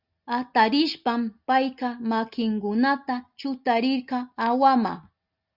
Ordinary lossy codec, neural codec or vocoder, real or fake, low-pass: Opus, 64 kbps; none; real; 5.4 kHz